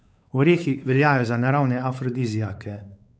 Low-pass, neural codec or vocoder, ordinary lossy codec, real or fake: none; codec, 16 kHz, 4 kbps, X-Codec, WavLM features, trained on Multilingual LibriSpeech; none; fake